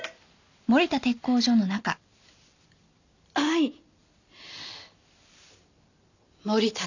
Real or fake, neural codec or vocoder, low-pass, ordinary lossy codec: real; none; 7.2 kHz; none